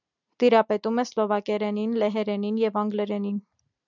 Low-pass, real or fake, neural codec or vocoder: 7.2 kHz; real; none